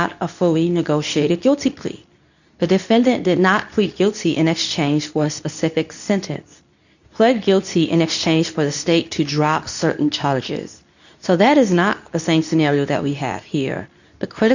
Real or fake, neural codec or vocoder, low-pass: fake; codec, 24 kHz, 0.9 kbps, WavTokenizer, medium speech release version 2; 7.2 kHz